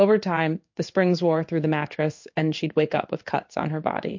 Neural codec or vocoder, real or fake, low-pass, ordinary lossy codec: codec, 16 kHz in and 24 kHz out, 1 kbps, XY-Tokenizer; fake; 7.2 kHz; MP3, 48 kbps